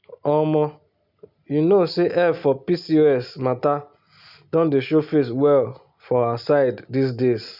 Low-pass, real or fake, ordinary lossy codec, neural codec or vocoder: 5.4 kHz; real; none; none